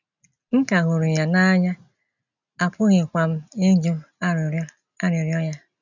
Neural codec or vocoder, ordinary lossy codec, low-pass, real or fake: none; none; 7.2 kHz; real